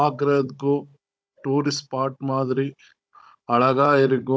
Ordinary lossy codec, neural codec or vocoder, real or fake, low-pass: none; codec, 16 kHz, 16 kbps, FunCodec, trained on Chinese and English, 50 frames a second; fake; none